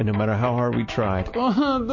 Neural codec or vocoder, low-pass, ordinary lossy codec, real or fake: none; 7.2 kHz; MP3, 32 kbps; real